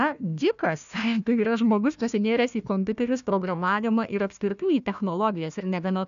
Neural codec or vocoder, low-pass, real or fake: codec, 16 kHz, 1 kbps, FunCodec, trained on Chinese and English, 50 frames a second; 7.2 kHz; fake